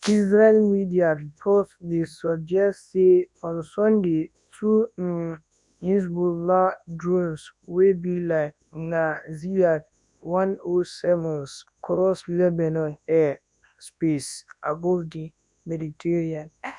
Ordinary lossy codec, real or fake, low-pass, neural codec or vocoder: none; fake; 10.8 kHz; codec, 24 kHz, 0.9 kbps, WavTokenizer, large speech release